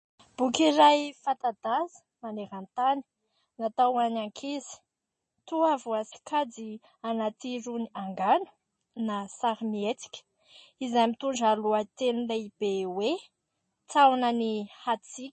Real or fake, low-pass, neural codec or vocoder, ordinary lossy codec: real; 10.8 kHz; none; MP3, 32 kbps